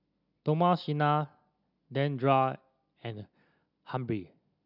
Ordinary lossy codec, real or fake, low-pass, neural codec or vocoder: none; real; 5.4 kHz; none